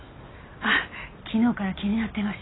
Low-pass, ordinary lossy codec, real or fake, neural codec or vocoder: 7.2 kHz; AAC, 16 kbps; real; none